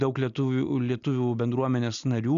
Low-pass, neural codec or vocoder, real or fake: 7.2 kHz; none; real